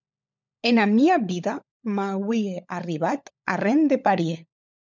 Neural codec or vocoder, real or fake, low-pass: codec, 16 kHz, 16 kbps, FunCodec, trained on LibriTTS, 50 frames a second; fake; 7.2 kHz